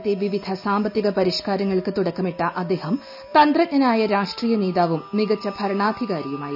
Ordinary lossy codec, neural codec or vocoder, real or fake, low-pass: none; none; real; 5.4 kHz